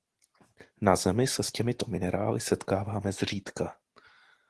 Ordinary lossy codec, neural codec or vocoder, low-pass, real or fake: Opus, 16 kbps; none; 10.8 kHz; real